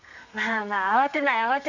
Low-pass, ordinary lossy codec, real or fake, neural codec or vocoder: 7.2 kHz; none; fake; codec, 32 kHz, 1.9 kbps, SNAC